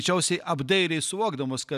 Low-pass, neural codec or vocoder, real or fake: 14.4 kHz; none; real